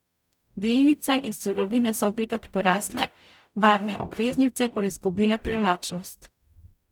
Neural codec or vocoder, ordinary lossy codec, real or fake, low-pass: codec, 44.1 kHz, 0.9 kbps, DAC; none; fake; 19.8 kHz